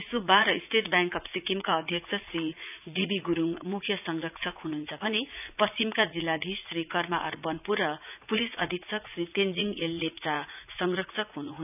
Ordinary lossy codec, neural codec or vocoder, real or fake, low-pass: none; vocoder, 44.1 kHz, 80 mel bands, Vocos; fake; 3.6 kHz